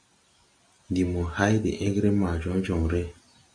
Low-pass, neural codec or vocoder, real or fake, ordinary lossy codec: 9.9 kHz; none; real; Opus, 64 kbps